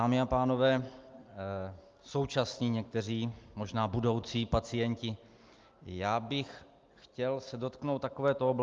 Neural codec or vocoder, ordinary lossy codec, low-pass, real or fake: none; Opus, 32 kbps; 7.2 kHz; real